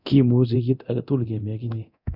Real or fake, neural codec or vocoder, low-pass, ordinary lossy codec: fake; codec, 24 kHz, 0.9 kbps, DualCodec; 5.4 kHz; none